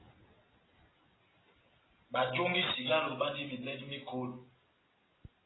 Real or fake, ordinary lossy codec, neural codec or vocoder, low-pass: real; AAC, 16 kbps; none; 7.2 kHz